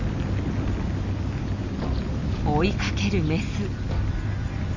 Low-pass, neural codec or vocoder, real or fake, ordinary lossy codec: 7.2 kHz; none; real; Opus, 64 kbps